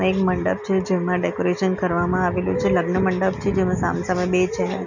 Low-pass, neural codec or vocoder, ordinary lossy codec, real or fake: 7.2 kHz; none; none; real